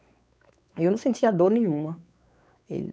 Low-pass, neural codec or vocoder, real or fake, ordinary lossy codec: none; codec, 16 kHz, 4 kbps, X-Codec, WavLM features, trained on Multilingual LibriSpeech; fake; none